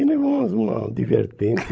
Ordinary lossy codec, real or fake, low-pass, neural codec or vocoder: none; fake; none; codec, 16 kHz, 16 kbps, FreqCodec, larger model